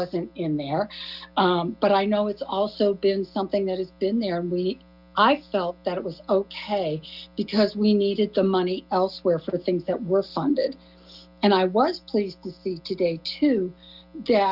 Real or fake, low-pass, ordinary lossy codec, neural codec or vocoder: real; 5.4 kHz; Opus, 64 kbps; none